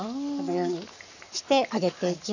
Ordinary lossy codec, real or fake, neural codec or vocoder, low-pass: none; fake; codec, 44.1 kHz, 7.8 kbps, Pupu-Codec; 7.2 kHz